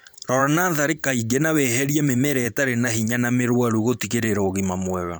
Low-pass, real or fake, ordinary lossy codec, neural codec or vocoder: none; fake; none; vocoder, 44.1 kHz, 128 mel bands every 512 samples, BigVGAN v2